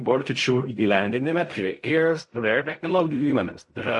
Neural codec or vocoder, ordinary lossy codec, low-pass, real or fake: codec, 16 kHz in and 24 kHz out, 0.4 kbps, LongCat-Audio-Codec, fine tuned four codebook decoder; MP3, 48 kbps; 10.8 kHz; fake